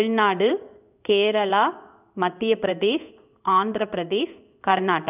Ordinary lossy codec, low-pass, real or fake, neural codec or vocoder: none; 3.6 kHz; fake; codec, 16 kHz in and 24 kHz out, 1 kbps, XY-Tokenizer